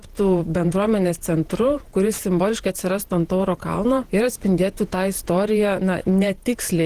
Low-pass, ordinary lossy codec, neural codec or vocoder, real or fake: 14.4 kHz; Opus, 16 kbps; vocoder, 48 kHz, 128 mel bands, Vocos; fake